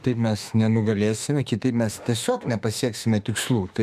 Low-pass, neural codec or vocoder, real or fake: 14.4 kHz; autoencoder, 48 kHz, 32 numbers a frame, DAC-VAE, trained on Japanese speech; fake